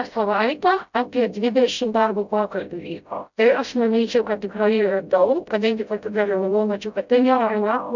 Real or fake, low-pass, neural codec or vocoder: fake; 7.2 kHz; codec, 16 kHz, 0.5 kbps, FreqCodec, smaller model